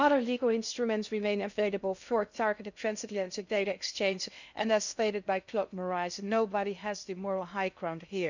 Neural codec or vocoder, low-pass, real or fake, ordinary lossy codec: codec, 16 kHz in and 24 kHz out, 0.6 kbps, FocalCodec, streaming, 2048 codes; 7.2 kHz; fake; none